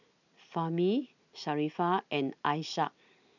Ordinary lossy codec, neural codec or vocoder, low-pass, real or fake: none; none; 7.2 kHz; real